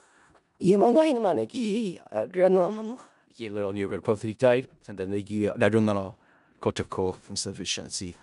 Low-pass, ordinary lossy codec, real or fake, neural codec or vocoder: 10.8 kHz; none; fake; codec, 16 kHz in and 24 kHz out, 0.4 kbps, LongCat-Audio-Codec, four codebook decoder